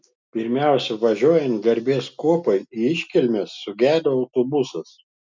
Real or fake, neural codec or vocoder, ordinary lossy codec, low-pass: real; none; MP3, 64 kbps; 7.2 kHz